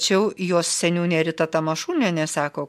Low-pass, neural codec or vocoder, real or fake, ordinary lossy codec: 14.4 kHz; none; real; MP3, 64 kbps